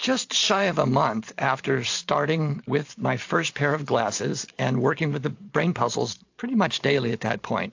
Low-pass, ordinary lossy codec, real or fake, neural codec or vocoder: 7.2 kHz; AAC, 48 kbps; fake; vocoder, 44.1 kHz, 128 mel bands every 512 samples, BigVGAN v2